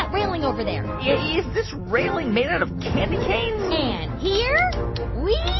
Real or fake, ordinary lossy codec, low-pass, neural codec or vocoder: real; MP3, 24 kbps; 7.2 kHz; none